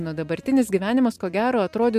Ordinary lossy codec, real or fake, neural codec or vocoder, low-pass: MP3, 96 kbps; real; none; 14.4 kHz